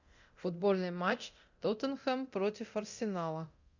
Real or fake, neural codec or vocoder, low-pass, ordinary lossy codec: fake; codec, 24 kHz, 0.9 kbps, DualCodec; 7.2 kHz; Opus, 64 kbps